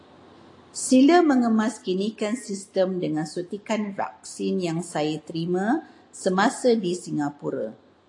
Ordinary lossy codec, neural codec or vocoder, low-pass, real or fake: AAC, 48 kbps; none; 10.8 kHz; real